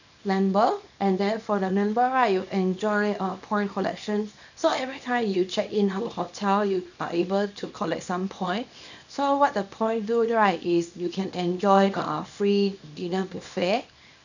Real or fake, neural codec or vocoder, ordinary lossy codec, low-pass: fake; codec, 24 kHz, 0.9 kbps, WavTokenizer, small release; none; 7.2 kHz